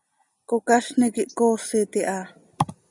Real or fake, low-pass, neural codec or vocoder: real; 10.8 kHz; none